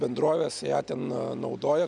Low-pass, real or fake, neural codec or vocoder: 10.8 kHz; real; none